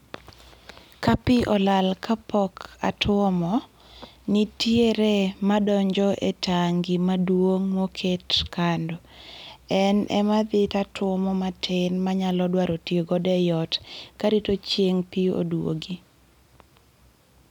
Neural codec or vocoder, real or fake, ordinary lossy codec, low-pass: none; real; none; 19.8 kHz